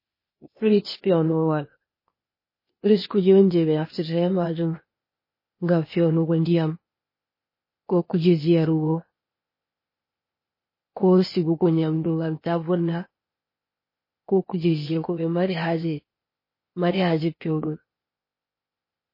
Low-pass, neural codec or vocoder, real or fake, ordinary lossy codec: 5.4 kHz; codec, 16 kHz, 0.8 kbps, ZipCodec; fake; MP3, 24 kbps